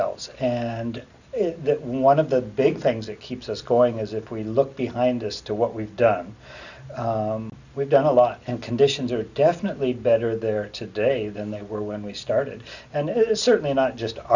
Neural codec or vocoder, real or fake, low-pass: none; real; 7.2 kHz